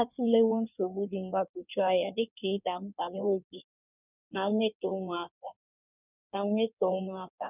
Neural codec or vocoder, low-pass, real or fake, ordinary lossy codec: codec, 16 kHz in and 24 kHz out, 1.1 kbps, FireRedTTS-2 codec; 3.6 kHz; fake; none